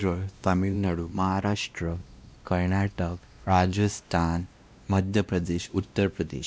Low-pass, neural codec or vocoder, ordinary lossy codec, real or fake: none; codec, 16 kHz, 1 kbps, X-Codec, WavLM features, trained on Multilingual LibriSpeech; none; fake